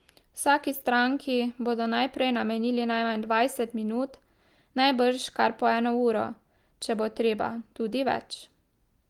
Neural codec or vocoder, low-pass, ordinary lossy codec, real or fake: none; 19.8 kHz; Opus, 24 kbps; real